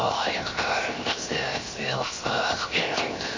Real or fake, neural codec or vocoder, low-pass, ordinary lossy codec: fake; codec, 16 kHz, 0.7 kbps, FocalCodec; 7.2 kHz; MP3, 32 kbps